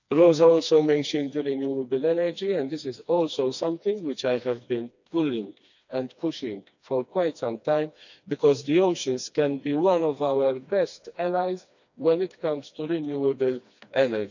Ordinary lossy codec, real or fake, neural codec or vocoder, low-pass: none; fake; codec, 16 kHz, 2 kbps, FreqCodec, smaller model; 7.2 kHz